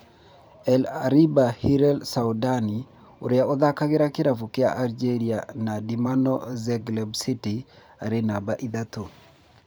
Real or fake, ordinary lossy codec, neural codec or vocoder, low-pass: fake; none; vocoder, 44.1 kHz, 128 mel bands every 512 samples, BigVGAN v2; none